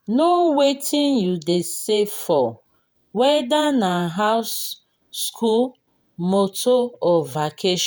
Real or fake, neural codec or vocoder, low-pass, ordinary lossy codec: fake; vocoder, 48 kHz, 128 mel bands, Vocos; none; none